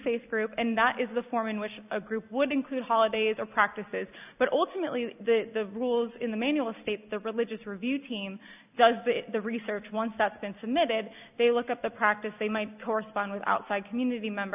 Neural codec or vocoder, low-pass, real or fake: none; 3.6 kHz; real